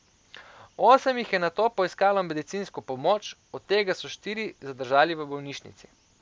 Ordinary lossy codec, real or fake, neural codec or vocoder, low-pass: none; real; none; none